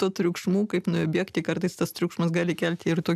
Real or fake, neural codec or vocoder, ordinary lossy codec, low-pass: real; none; AAC, 96 kbps; 14.4 kHz